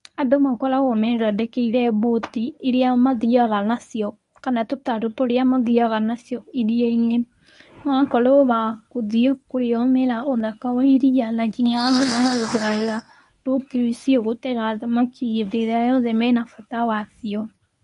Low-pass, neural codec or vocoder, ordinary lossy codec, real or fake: 10.8 kHz; codec, 24 kHz, 0.9 kbps, WavTokenizer, medium speech release version 1; none; fake